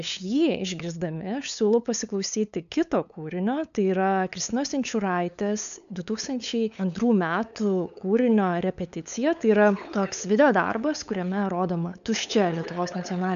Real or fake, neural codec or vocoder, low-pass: fake; codec, 16 kHz, 8 kbps, FunCodec, trained on LibriTTS, 25 frames a second; 7.2 kHz